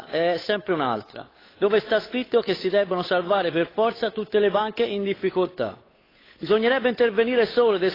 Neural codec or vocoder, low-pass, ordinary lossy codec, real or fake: codec, 16 kHz, 8 kbps, FunCodec, trained on Chinese and English, 25 frames a second; 5.4 kHz; AAC, 24 kbps; fake